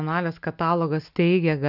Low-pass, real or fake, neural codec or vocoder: 5.4 kHz; real; none